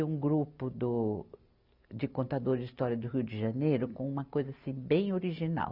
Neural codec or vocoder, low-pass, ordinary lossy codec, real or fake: none; 5.4 kHz; Opus, 64 kbps; real